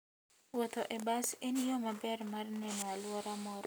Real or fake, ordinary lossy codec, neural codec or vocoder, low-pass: real; none; none; none